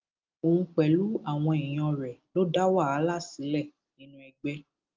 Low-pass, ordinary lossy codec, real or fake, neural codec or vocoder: 7.2 kHz; Opus, 32 kbps; real; none